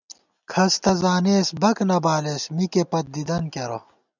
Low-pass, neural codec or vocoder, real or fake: 7.2 kHz; none; real